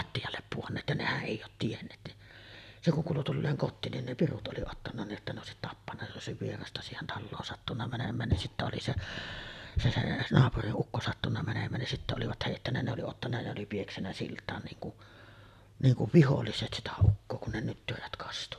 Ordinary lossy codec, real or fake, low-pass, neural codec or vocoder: none; real; 14.4 kHz; none